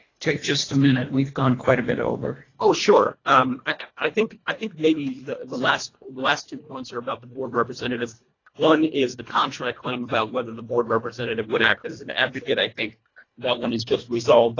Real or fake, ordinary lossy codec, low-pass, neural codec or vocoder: fake; AAC, 32 kbps; 7.2 kHz; codec, 24 kHz, 1.5 kbps, HILCodec